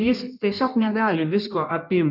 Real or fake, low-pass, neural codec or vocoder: fake; 5.4 kHz; codec, 16 kHz in and 24 kHz out, 1.1 kbps, FireRedTTS-2 codec